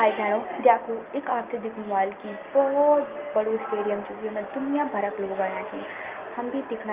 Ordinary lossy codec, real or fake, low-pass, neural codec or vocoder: Opus, 32 kbps; real; 3.6 kHz; none